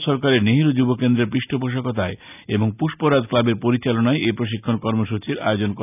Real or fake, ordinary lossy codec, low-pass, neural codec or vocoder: real; none; 3.6 kHz; none